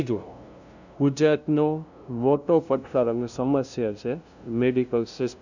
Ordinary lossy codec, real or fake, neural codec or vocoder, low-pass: none; fake; codec, 16 kHz, 0.5 kbps, FunCodec, trained on LibriTTS, 25 frames a second; 7.2 kHz